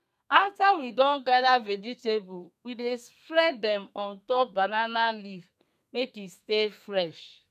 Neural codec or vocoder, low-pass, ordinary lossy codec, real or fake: codec, 32 kHz, 1.9 kbps, SNAC; 14.4 kHz; none; fake